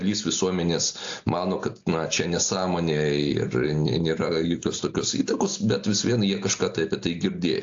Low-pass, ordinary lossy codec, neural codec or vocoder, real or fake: 7.2 kHz; AAC, 48 kbps; none; real